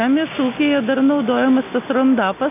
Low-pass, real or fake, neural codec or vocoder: 3.6 kHz; real; none